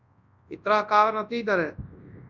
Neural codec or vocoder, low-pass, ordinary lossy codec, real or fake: codec, 24 kHz, 0.9 kbps, WavTokenizer, large speech release; 7.2 kHz; Opus, 64 kbps; fake